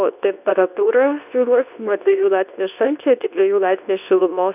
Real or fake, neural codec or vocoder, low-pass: fake; codec, 24 kHz, 0.9 kbps, WavTokenizer, medium speech release version 2; 3.6 kHz